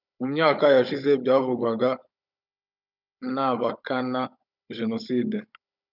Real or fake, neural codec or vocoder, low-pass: fake; codec, 16 kHz, 16 kbps, FunCodec, trained on Chinese and English, 50 frames a second; 5.4 kHz